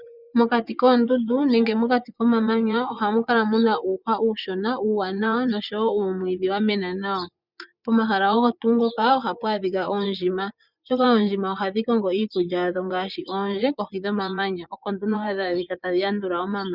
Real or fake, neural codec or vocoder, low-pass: fake; vocoder, 24 kHz, 100 mel bands, Vocos; 5.4 kHz